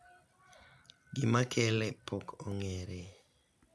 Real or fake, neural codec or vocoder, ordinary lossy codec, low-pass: real; none; none; none